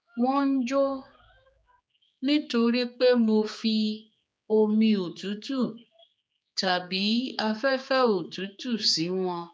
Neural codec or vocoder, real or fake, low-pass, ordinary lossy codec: codec, 16 kHz, 4 kbps, X-Codec, HuBERT features, trained on general audio; fake; none; none